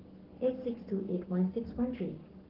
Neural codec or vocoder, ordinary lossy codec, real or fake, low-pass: codec, 44.1 kHz, 7.8 kbps, Pupu-Codec; Opus, 16 kbps; fake; 5.4 kHz